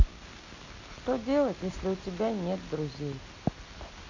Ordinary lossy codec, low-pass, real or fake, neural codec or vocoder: AAC, 48 kbps; 7.2 kHz; real; none